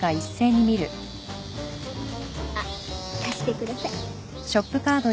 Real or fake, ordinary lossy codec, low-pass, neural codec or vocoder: real; none; none; none